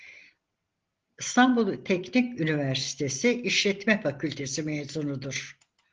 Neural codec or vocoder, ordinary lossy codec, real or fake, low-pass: none; Opus, 16 kbps; real; 7.2 kHz